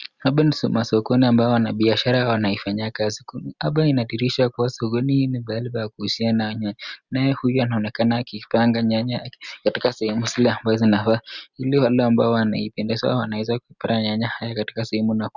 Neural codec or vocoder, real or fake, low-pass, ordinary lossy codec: none; real; 7.2 kHz; Opus, 64 kbps